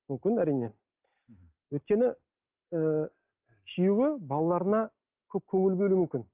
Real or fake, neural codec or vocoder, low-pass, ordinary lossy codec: real; none; 3.6 kHz; Opus, 24 kbps